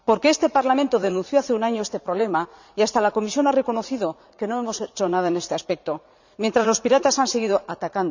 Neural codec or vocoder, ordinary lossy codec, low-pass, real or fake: vocoder, 44.1 kHz, 80 mel bands, Vocos; none; 7.2 kHz; fake